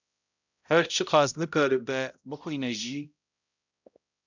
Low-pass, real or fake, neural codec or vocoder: 7.2 kHz; fake; codec, 16 kHz, 0.5 kbps, X-Codec, HuBERT features, trained on balanced general audio